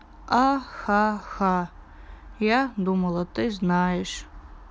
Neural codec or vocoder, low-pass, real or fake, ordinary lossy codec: none; none; real; none